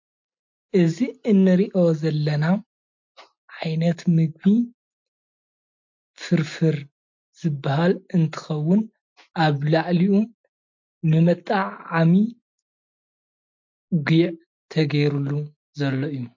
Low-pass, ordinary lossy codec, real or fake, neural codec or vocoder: 7.2 kHz; MP3, 48 kbps; real; none